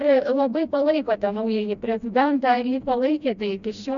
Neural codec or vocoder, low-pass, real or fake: codec, 16 kHz, 1 kbps, FreqCodec, smaller model; 7.2 kHz; fake